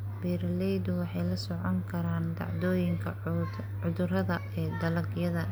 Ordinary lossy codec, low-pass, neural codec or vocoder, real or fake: none; none; none; real